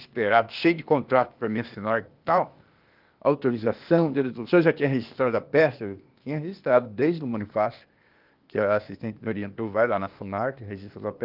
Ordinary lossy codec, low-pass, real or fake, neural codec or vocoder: Opus, 32 kbps; 5.4 kHz; fake; codec, 16 kHz, 0.8 kbps, ZipCodec